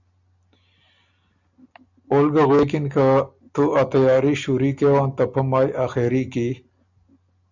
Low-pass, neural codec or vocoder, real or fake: 7.2 kHz; none; real